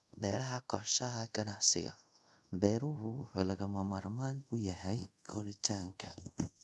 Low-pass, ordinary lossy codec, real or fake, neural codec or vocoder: none; none; fake; codec, 24 kHz, 0.5 kbps, DualCodec